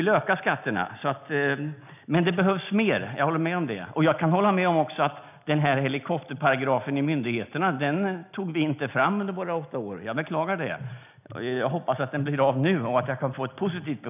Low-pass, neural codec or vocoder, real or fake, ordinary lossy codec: 3.6 kHz; none; real; none